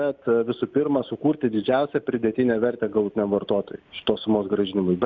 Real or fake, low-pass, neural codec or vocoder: real; 7.2 kHz; none